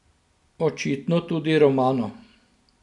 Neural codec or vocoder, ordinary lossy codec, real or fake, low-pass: none; none; real; 10.8 kHz